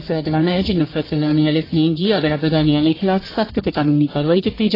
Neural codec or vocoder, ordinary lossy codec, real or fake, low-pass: codec, 44.1 kHz, 1.7 kbps, Pupu-Codec; AAC, 24 kbps; fake; 5.4 kHz